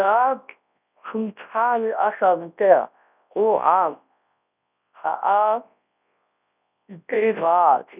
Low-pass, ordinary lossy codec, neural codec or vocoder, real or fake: 3.6 kHz; none; codec, 24 kHz, 0.9 kbps, WavTokenizer, large speech release; fake